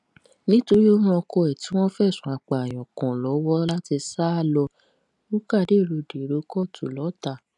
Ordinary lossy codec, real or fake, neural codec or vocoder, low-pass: none; real; none; 10.8 kHz